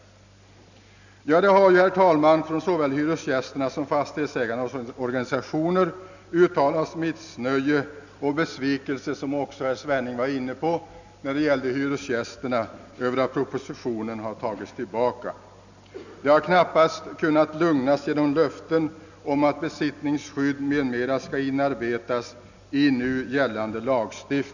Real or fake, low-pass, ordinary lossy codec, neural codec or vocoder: real; 7.2 kHz; none; none